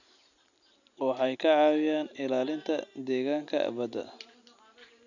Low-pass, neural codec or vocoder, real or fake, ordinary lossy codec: 7.2 kHz; none; real; none